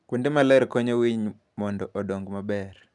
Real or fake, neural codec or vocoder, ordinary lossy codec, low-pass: real; none; none; 10.8 kHz